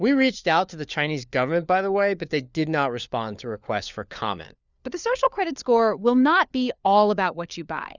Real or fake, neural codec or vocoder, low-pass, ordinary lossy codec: fake; codec, 16 kHz, 4 kbps, FunCodec, trained on LibriTTS, 50 frames a second; 7.2 kHz; Opus, 64 kbps